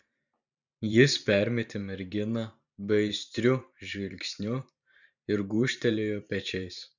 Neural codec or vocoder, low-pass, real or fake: vocoder, 44.1 kHz, 128 mel bands every 512 samples, BigVGAN v2; 7.2 kHz; fake